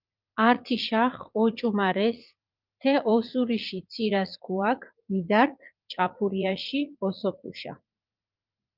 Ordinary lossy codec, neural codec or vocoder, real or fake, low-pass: Opus, 32 kbps; vocoder, 44.1 kHz, 80 mel bands, Vocos; fake; 5.4 kHz